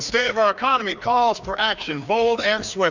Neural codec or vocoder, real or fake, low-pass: codec, 16 kHz, 2 kbps, FreqCodec, larger model; fake; 7.2 kHz